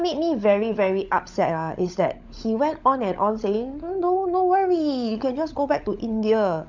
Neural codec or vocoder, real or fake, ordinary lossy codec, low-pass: codec, 16 kHz, 16 kbps, FunCodec, trained on LibriTTS, 50 frames a second; fake; none; 7.2 kHz